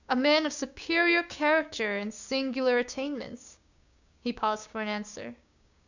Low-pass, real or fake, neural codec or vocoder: 7.2 kHz; fake; codec, 16 kHz, 6 kbps, DAC